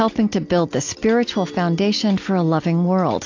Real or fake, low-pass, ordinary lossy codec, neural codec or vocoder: real; 7.2 kHz; AAC, 48 kbps; none